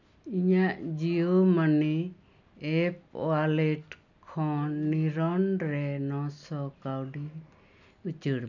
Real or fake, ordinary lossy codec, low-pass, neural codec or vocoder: real; none; 7.2 kHz; none